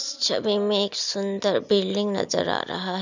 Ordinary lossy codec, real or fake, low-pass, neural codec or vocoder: none; real; 7.2 kHz; none